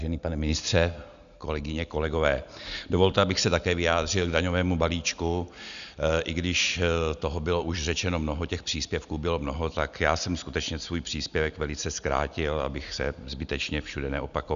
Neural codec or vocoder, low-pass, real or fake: none; 7.2 kHz; real